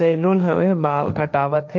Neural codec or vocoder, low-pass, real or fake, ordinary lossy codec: codec, 16 kHz, 1.1 kbps, Voila-Tokenizer; none; fake; none